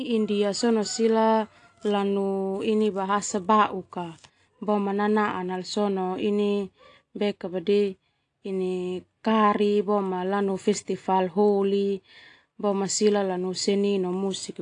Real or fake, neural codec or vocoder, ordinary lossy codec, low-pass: real; none; AAC, 48 kbps; 9.9 kHz